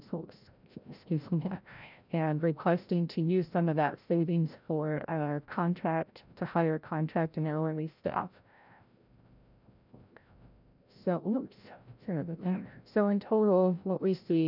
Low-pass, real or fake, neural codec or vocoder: 5.4 kHz; fake; codec, 16 kHz, 0.5 kbps, FreqCodec, larger model